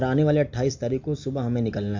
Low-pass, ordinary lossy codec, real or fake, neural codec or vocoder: 7.2 kHz; MP3, 48 kbps; real; none